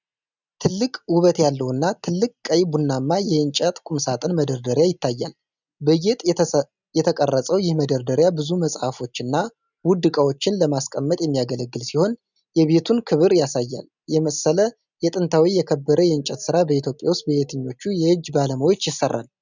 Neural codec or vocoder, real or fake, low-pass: none; real; 7.2 kHz